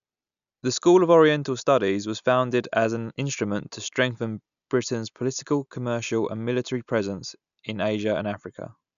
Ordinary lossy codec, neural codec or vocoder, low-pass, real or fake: none; none; 7.2 kHz; real